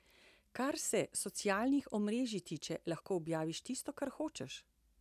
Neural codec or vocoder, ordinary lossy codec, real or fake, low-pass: none; none; real; 14.4 kHz